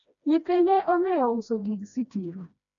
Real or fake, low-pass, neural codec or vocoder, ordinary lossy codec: fake; 7.2 kHz; codec, 16 kHz, 1 kbps, FreqCodec, smaller model; none